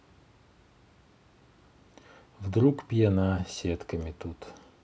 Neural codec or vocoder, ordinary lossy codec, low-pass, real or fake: none; none; none; real